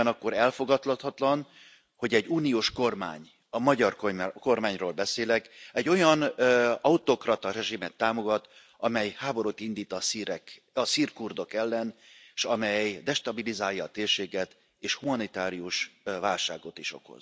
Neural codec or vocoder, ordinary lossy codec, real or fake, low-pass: none; none; real; none